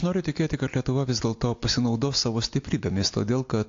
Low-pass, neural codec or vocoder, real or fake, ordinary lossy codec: 7.2 kHz; none; real; AAC, 48 kbps